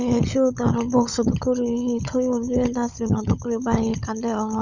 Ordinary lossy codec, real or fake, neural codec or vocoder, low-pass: none; fake; codec, 16 kHz, 16 kbps, FunCodec, trained on LibriTTS, 50 frames a second; 7.2 kHz